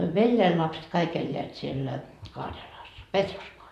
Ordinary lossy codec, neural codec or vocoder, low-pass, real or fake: none; vocoder, 44.1 kHz, 128 mel bands, Pupu-Vocoder; 14.4 kHz; fake